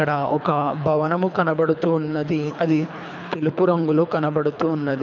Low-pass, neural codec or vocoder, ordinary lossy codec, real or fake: 7.2 kHz; codec, 24 kHz, 3 kbps, HILCodec; none; fake